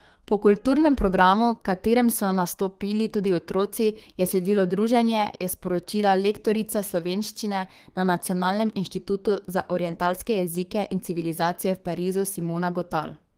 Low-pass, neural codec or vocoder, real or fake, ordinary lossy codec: 14.4 kHz; codec, 32 kHz, 1.9 kbps, SNAC; fake; Opus, 24 kbps